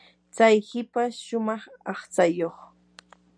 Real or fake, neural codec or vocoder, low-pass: real; none; 9.9 kHz